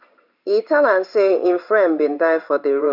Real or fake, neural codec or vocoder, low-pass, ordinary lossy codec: fake; codec, 16 kHz in and 24 kHz out, 1 kbps, XY-Tokenizer; 5.4 kHz; none